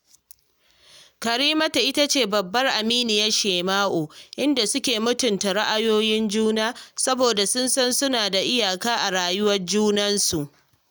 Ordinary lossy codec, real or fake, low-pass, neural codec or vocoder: none; real; none; none